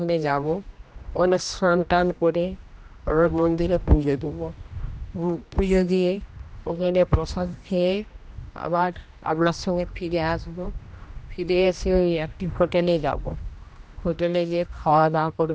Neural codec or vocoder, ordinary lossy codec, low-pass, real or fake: codec, 16 kHz, 1 kbps, X-Codec, HuBERT features, trained on general audio; none; none; fake